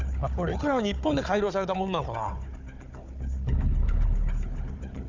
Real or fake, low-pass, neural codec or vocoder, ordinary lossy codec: fake; 7.2 kHz; codec, 16 kHz, 4 kbps, FunCodec, trained on Chinese and English, 50 frames a second; none